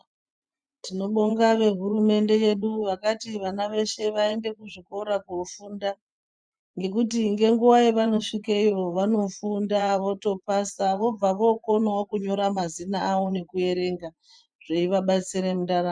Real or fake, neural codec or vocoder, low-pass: fake; vocoder, 24 kHz, 100 mel bands, Vocos; 9.9 kHz